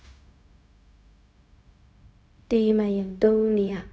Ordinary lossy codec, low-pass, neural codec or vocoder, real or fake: none; none; codec, 16 kHz, 0.4 kbps, LongCat-Audio-Codec; fake